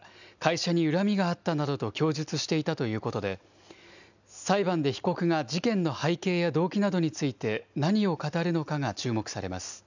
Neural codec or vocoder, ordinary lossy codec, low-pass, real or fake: none; none; 7.2 kHz; real